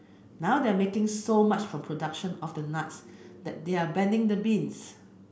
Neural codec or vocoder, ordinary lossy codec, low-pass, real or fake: none; none; none; real